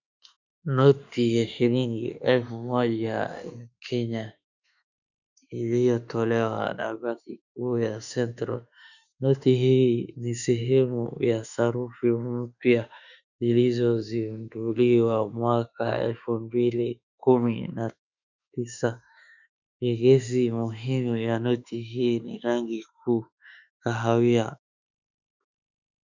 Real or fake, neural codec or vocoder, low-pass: fake; autoencoder, 48 kHz, 32 numbers a frame, DAC-VAE, trained on Japanese speech; 7.2 kHz